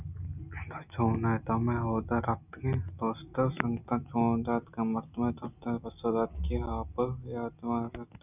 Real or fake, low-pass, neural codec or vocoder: real; 3.6 kHz; none